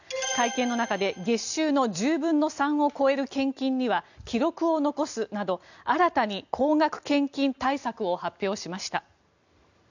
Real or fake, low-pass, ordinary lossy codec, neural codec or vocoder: real; 7.2 kHz; none; none